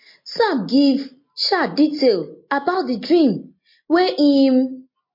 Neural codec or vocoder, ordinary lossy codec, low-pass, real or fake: none; MP3, 32 kbps; 5.4 kHz; real